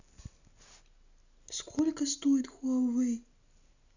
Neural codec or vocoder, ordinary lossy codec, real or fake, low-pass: none; none; real; 7.2 kHz